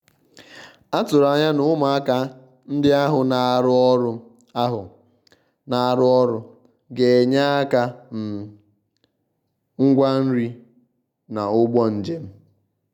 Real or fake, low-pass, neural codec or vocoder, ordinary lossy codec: real; 19.8 kHz; none; none